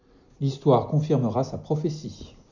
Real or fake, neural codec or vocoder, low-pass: real; none; 7.2 kHz